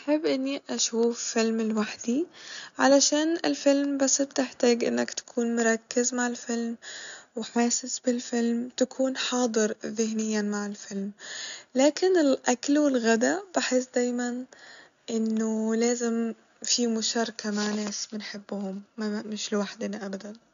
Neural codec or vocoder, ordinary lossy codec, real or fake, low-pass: none; none; real; 7.2 kHz